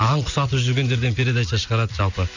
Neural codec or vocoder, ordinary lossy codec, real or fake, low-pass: none; none; real; 7.2 kHz